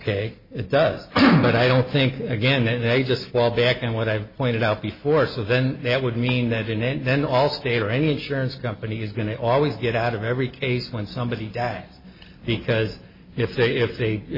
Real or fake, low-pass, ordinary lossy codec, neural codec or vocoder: real; 5.4 kHz; MP3, 24 kbps; none